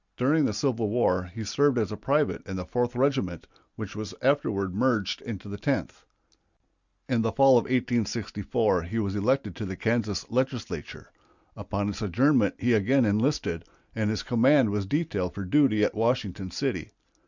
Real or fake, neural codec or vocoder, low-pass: real; none; 7.2 kHz